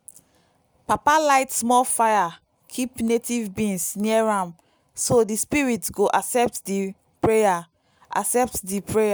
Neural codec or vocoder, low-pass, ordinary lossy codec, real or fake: none; none; none; real